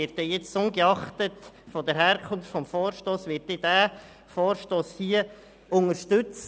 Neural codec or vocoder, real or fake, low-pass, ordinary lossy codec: none; real; none; none